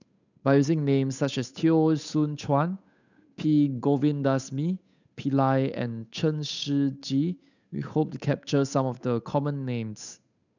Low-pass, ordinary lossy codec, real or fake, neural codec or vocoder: 7.2 kHz; none; fake; codec, 16 kHz, 8 kbps, FunCodec, trained on Chinese and English, 25 frames a second